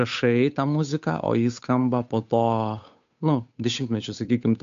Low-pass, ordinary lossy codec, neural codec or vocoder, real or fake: 7.2 kHz; MP3, 64 kbps; codec, 16 kHz, 8 kbps, FunCodec, trained on Chinese and English, 25 frames a second; fake